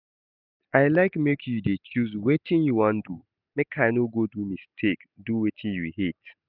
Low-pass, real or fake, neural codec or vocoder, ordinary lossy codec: 5.4 kHz; real; none; none